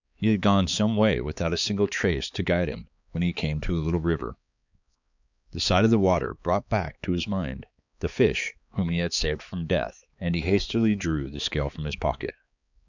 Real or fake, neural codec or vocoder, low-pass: fake; codec, 16 kHz, 4 kbps, X-Codec, HuBERT features, trained on balanced general audio; 7.2 kHz